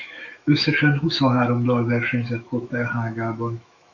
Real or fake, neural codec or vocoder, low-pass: fake; autoencoder, 48 kHz, 128 numbers a frame, DAC-VAE, trained on Japanese speech; 7.2 kHz